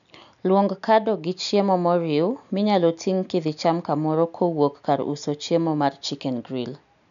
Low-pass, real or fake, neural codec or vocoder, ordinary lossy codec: 7.2 kHz; real; none; none